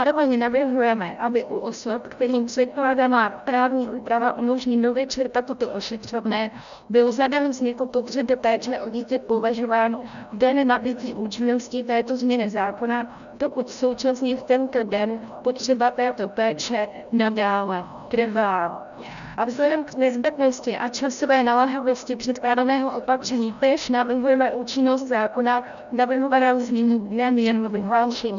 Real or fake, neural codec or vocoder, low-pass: fake; codec, 16 kHz, 0.5 kbps, FreqCodec, larger model; 7.2 kHz